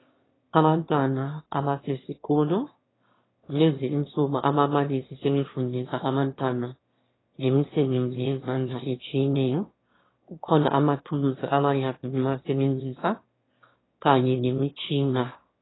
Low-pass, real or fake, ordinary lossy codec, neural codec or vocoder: 7.2 kHz; fake; AAC, 16 kbps; autoencoder, 22.05 kHz, a latent of 192 numbers a frame, VITS, trained on one speaker